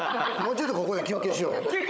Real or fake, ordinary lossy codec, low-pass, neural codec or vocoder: fake; none; none; codec, 16 kHz, 16 kbps, FunCodec, trained on Chinese and English, 50 frames a second